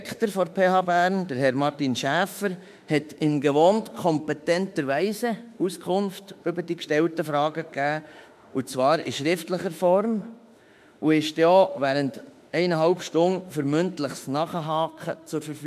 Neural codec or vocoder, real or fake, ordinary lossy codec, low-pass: autoencoder, 48 kHz, 32 numbers a frame, DAC-VAE, trained on Japanese speech; fake; MP3, 96 kbps; 14.4 kHz